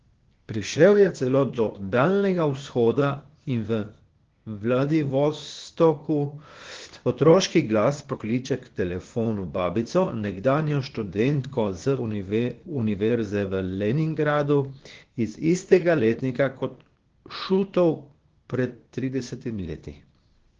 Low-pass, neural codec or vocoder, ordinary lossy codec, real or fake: 7.2 kHz; codec, 16 kHz, 0.8 kbps, ZipCodec; Opus, 16 kbps; fake